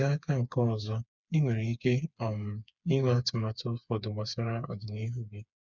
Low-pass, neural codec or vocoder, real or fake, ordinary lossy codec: 7.2 kHz; codec, 16 kHz, 4 kbps, FreqCodec, smaller model; fake; none